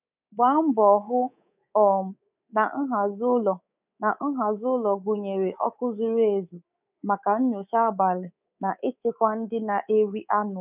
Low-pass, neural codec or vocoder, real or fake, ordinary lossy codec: 3.6 kHz; codec, 24 kHz, 3.1 kbps, DualCodec; fake; none